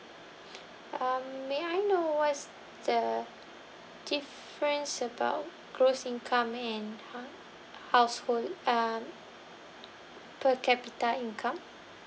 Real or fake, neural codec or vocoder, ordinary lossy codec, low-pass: real; none; none; none